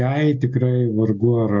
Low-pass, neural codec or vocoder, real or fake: 7.2 kHz; none; real